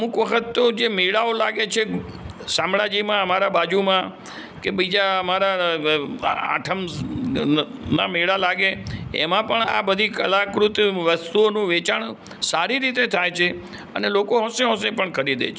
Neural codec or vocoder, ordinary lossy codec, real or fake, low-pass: none; none; real; none